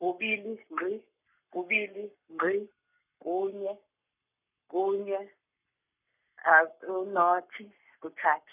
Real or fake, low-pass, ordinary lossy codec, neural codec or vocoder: fake; 3.6 kHz; none; codec, 44.1 kHz, 7.8 kbps, Pupu-Codec